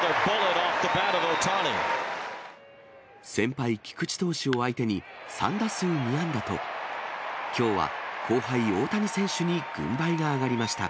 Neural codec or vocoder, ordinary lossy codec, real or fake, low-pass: none; none; real; none